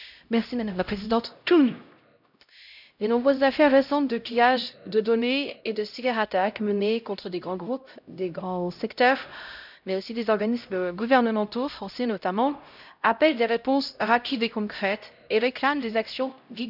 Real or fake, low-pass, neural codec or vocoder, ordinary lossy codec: fake; 5.4 kHz; codec, 16 kHz, 0.5 kbps, X-Codec, HuBERT features, trained on LibriSpeech; none